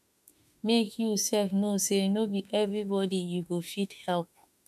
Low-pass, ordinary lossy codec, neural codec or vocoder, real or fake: 14.4 kHz; none; autoencoder, 48 kHz, 32 numbers a frame, DAC-VAE, trained on Japanese speech; fake